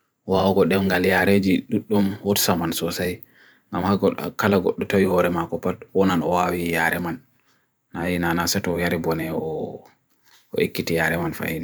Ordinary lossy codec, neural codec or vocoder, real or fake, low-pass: none; vocoder, 44.1 kHz, 128 mel bands every 512 samples, BigVGAN v2; fake; none